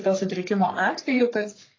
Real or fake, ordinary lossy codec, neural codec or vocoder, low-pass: fake; AAC, 32 kbps; codec, 44.1 kHz, 3.4 kbps, Pupu-Codec; 7.2 kHz